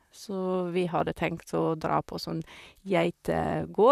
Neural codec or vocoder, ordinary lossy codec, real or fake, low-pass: vocoder, 44.1 kHz, 128 mel bands, Pupu-Vocoder; none; fake; 14.4 kHz